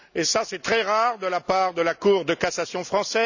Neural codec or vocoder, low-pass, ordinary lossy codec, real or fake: none; 7.2 kHz; none; real